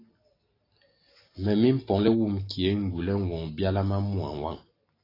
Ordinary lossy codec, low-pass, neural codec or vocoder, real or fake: AAC, 24 kbps; 5.4 kHz; none; real